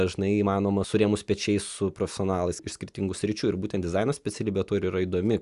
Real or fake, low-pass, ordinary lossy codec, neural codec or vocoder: real; 10.8 kHz; Opus, 64 kbps; none